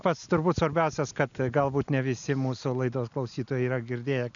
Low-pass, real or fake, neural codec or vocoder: 7.2 kHz; real; none